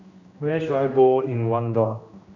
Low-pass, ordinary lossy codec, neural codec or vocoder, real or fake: 7.2 kHz; none; codec, 16 kHz, 1 kbps, X-Codec, HuBERT features, trained on general audio; fake